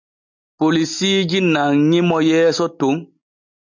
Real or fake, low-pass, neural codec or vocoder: real; 7.2 kHz; none